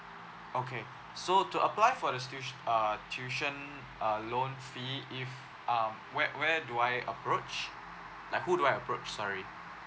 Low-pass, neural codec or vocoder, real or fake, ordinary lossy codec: none; none; real; none